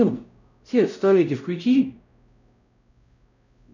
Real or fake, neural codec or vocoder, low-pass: fake; codec, 16 kHz, 0.5 kbps, X-Codec, WavLM features, trained on Multilingual LibriSpeech; 7.2 kHz